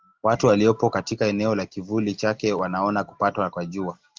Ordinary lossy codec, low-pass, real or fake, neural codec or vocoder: Opus, 32 kbps; 7.2 kHz; real; none